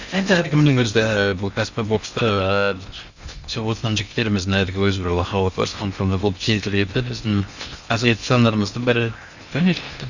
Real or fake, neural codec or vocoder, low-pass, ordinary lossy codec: fake; codec, 16 kHz in and 24 kHz out, 0.6 kbps, FocalCodec, streaming, 4096 codes; 7.2 kHz; Opus, 64 kbps